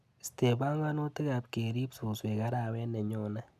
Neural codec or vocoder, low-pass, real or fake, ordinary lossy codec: none; 14.4 kHz; real; none